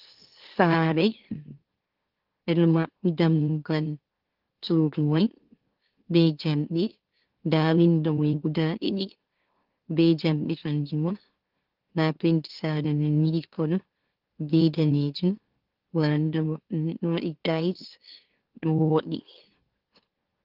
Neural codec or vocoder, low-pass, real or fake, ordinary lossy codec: autoencoder, 44.1 kHz, a latent of 192 numbers a frame, MeloTTS; 5.4 kHz; fake; Opus, 16 kbps